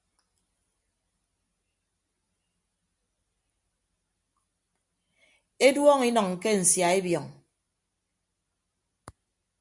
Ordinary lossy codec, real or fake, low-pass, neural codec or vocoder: MP3, 64 kbps; real; 10.8 kHz; none